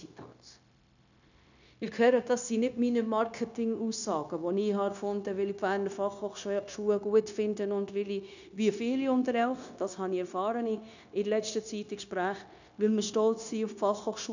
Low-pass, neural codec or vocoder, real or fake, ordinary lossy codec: 7.2 kHz; codec, 16 kHz, 0.9 kbps, LongCat-Audio-Codec; fake; none